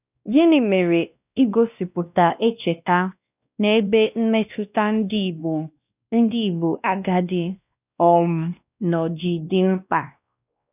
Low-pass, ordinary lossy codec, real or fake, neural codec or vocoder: 3.6 kHz; none; fake; codec, 16 kHz, 1 kbps, X-Codec, WavLM features, trained on Multilingual LibriSpeech